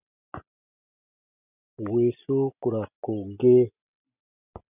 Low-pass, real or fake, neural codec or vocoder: 3.6 kHz; real; none